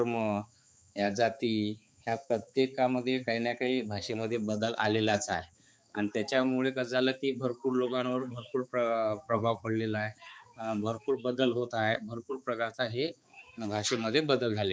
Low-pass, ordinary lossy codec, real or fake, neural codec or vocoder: none; none; fake; codec, 16 kHz, 4 kbps, X-Codec, HuBERT features, trained on balanced general audio